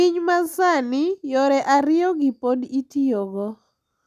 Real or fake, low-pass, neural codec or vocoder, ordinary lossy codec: real; 14.4 kHz; none; none